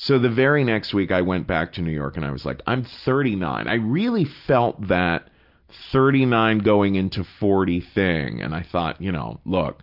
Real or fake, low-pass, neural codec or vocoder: real; 5.4 kHz; none